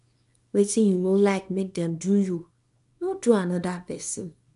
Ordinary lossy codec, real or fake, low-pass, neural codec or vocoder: none; fake; 10.8 kHz; codec, 24 kHz, 0.9 kbps, WavTokenizer, small release